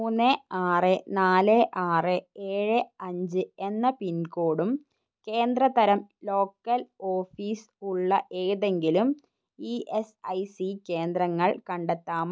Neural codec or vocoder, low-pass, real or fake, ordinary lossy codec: none; none; real; none